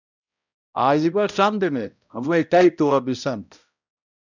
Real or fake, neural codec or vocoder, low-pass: fake; codec, 16 kHz, 0.5 kbps, X-Codec, HuBERT features, trained on balanced general audio; 7.2 kHz